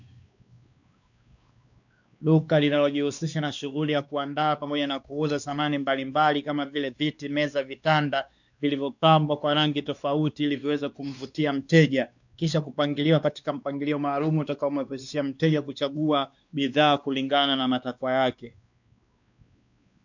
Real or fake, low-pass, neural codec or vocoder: fake; 7.2 kHz; codec, 16 kHz, 2 kbps, X-Codec, WavLM features, trained on Multilingual LibriSpeech